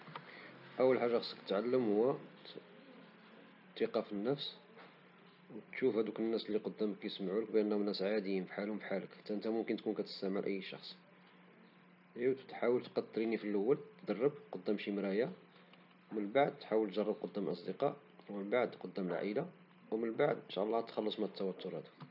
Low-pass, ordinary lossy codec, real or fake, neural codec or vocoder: 5.4 kHz; none; real; none